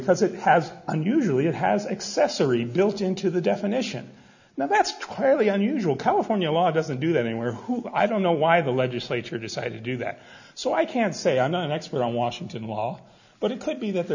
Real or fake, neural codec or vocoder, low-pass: real; none; 7.2 kHz